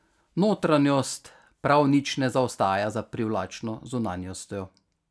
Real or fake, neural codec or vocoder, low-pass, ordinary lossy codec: real; none; none; none